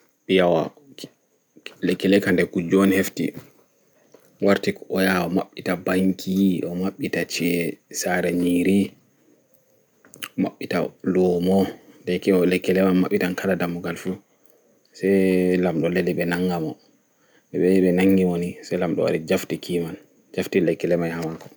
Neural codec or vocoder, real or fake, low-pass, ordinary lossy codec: none; real; none; none